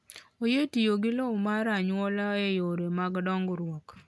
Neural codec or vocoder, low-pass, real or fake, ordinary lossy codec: none; none; real; none